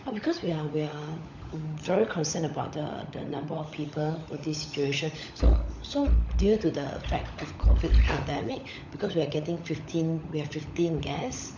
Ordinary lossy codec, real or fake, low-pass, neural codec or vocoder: none; fake; 7.2 kHz; codec, 16 kHz, 16 kbps, FunCodec, trained on LibriTTS, 50 frames a second